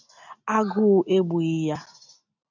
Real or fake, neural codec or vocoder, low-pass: real; none; 7.2 kHz